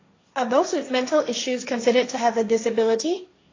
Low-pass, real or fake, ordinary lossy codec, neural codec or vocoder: 7.2 kHz; fake; AAC, 32 kbps; codec, 16 kHz, 1.1 kbps, Voila-Tokenizer